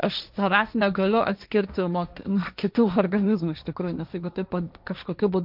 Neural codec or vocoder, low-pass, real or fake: codec, 16 kHz, 1.1 kbps, Voila-Tokenizer; 5.4 kHz; fake